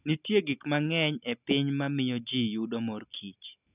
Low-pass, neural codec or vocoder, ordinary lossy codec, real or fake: 3.6 kHz; none; none; real